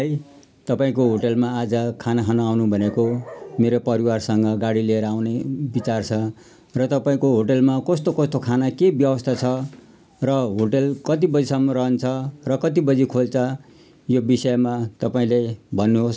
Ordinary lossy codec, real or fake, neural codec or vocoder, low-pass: none; real; none; none